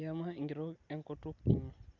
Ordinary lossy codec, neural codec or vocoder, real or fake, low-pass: Opus, 64 kbps; none; real; 7.2 kHz